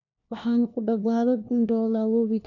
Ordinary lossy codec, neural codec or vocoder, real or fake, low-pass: none; codec, 16 kHz, 1 kbps, FunCodec, trained on LibriTTS, 50 frames a second; fake; 7.2 kHz